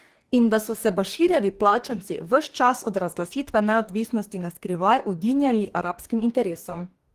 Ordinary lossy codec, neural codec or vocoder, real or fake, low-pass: Opus, 24 kbps; codec, 44.1 kHz, 2.6 kbps, DAC; fake; 14.4 kHz